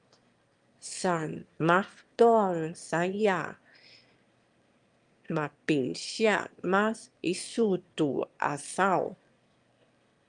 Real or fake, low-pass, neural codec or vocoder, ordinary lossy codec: fake; 9.9 kHz; autoencoder, 22.05 kHz, a latent of 192 numbers a frame, VITS, trained on one speaker; Opus, 32 kbps